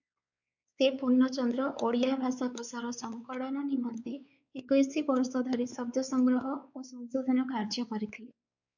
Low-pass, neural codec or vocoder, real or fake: 7.2 kHz; codec, 16 kHz, 4 kbps, X-Codec, WavLM features, trained on Multilingual LibriSpeech; fake